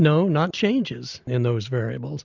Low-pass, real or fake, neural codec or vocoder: 7.2 kHz; real; none